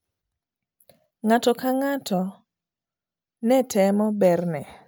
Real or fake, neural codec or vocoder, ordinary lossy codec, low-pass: real; none; none; none